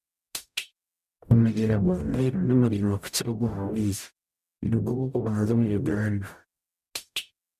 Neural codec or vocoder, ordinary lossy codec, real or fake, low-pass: codec, 44.1 kHz, 0.9 kbps, DAC; none; fake; 14.4 kHz